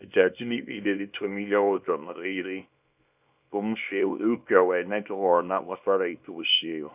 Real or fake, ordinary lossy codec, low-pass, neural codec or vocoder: fake; none; 3.6 kHz; codec, 24 kHz, 0.9 kbps, WavTokenizer, small release